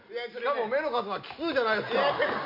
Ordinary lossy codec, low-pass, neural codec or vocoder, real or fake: MP3, 48 kbps; 5.4 kHz; none; real